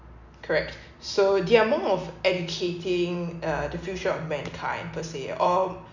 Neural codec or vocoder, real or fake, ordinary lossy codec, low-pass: none; real; none; 7.2 kHz